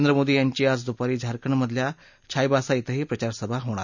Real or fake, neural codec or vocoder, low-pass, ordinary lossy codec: real; none; 7.2 kHz; none